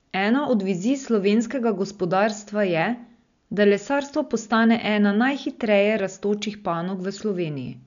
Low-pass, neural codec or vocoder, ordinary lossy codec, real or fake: 7.2 kHz; none; none; real